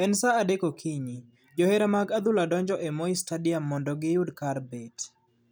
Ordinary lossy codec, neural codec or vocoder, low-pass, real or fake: none; none; none; real